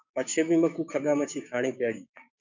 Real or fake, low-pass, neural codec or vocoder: fake; 7.2 kHz; codec, 16 kHz, 6 kbps, DAC